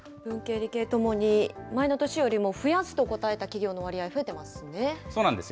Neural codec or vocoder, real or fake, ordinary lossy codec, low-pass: none; real; none; none